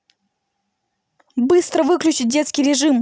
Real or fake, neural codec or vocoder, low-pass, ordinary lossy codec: real; none; none; none